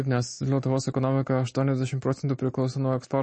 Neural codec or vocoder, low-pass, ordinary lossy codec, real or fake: none; 10.8 kHz; MP3, 32 kbps; real